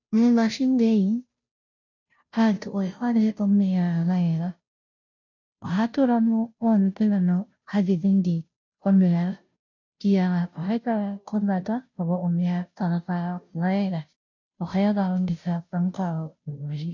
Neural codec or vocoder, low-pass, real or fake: codec, 16 kHz, 0.5 kbps, FunCodec, trained on Chinese and English, 25 frames a second; 7.2 kHz; fake